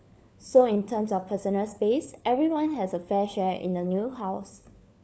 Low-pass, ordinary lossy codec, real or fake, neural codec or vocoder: none; none; fake; codec, 16 kHz, 4 kbps, FunCodec, trained on LibriTTS, 50 frames a second